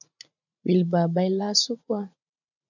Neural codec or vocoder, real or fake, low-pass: vocoder, 44.1 kHz, 80 mel bands, Vocos; fake; 7.2 kHz